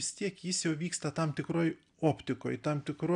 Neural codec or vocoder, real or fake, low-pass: none; real; 9.9 kHz